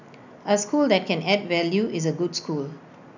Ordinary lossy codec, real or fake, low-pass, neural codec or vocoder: none; real; 7.2 kHz; none